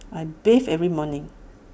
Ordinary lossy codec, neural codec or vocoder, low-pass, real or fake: none; none; none; real